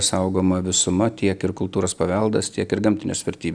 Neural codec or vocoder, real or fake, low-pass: none; real; 9.9 kHz